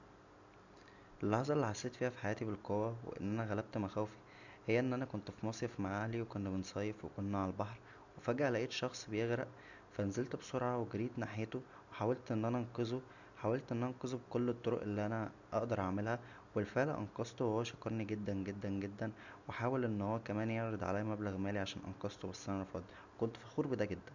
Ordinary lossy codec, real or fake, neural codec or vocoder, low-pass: none; real; none; 7.2 kHz